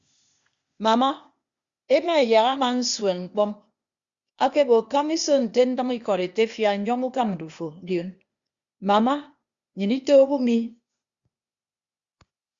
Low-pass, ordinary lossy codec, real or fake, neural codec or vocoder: 7.2 kHz; Opus, 64 kbps; fake; codec, 16 kHz, 0.8 kbps, ZipCodec